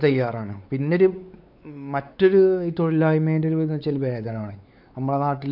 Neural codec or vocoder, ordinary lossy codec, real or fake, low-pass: codec, 16 kHz, 4 kbps, X-Codec, WavLM features, trained on Multilingual LibriSpeech; none; fake; 5.4 kHz